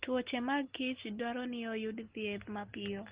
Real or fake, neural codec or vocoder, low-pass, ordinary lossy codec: real; none; 3.6 kHz; Opus, 16 kbps